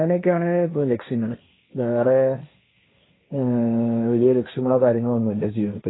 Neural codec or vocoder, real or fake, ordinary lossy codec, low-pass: codec, 16 kHz, 1.1 kbps, Voila-Tokenizer; fake; AAC, 16 kbps; 7.2 kHz